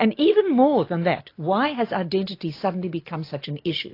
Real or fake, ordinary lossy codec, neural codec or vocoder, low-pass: real; AAC, 32 kbps; none; 5.4 kHz